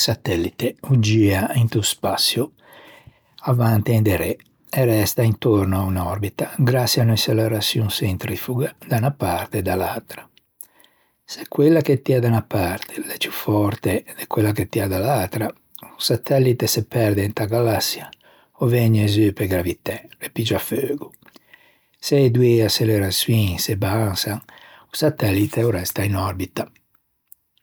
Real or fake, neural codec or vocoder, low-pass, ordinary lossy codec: real; none; none; none